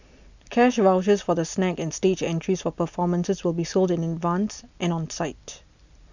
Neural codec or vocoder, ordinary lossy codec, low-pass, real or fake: none; none; 7.2 kHz; real